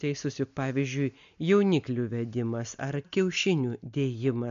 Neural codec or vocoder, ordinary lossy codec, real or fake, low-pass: none; MP3, 64 kbps; real; 7.2 kHz